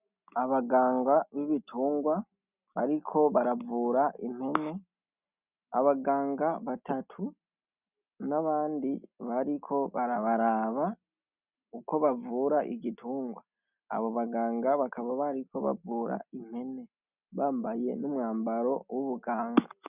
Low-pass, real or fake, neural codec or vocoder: 3.6 kHz; real; none